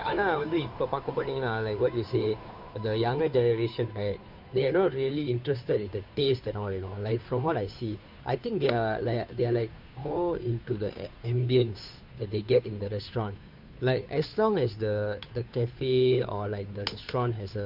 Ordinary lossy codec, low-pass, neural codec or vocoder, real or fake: none; 5.4 kHz; codec, 16 kHz, 2 kbps, FunCodec, trained on Chinese and English, 25 frames a second; fake